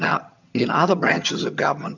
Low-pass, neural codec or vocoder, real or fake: 7.2 kHz; vocoder, 22.05 kHz, 80 mel bands, HiFi-GAN; fake